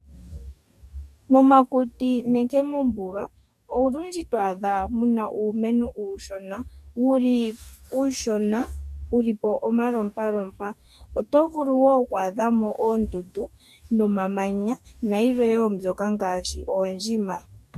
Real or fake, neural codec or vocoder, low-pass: fake; codec, 44.1 kHz, 2.6 kbps, DAC; 14.4 kHz